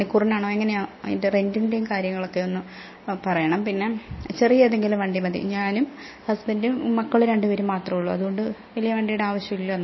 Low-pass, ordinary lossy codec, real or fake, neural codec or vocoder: 7.2 kHz; MP3, 24 kbps; real; none